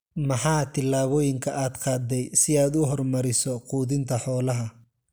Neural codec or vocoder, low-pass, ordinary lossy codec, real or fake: none; none; none; real